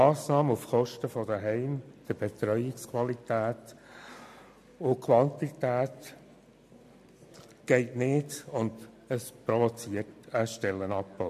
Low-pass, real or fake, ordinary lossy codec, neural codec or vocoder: 14.4 kHz; real; MP3, 64 kbps; none